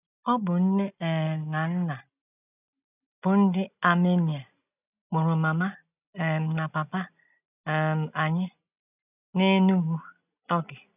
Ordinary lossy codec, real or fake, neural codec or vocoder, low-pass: none; real; none; 3.6 kHz